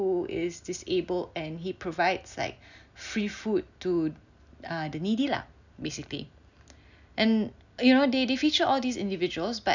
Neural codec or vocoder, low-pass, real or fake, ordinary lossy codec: none; 7.2 kHz; real; none